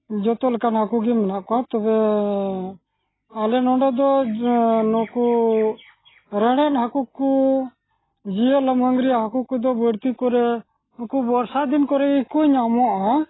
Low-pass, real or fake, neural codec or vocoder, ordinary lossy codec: 7.2 kHz; real; none; AAC, 16 kbps